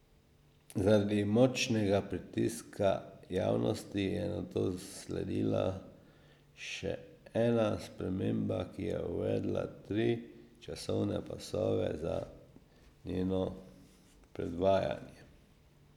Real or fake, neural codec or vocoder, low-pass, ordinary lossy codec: real; none; 19.8 kHz; none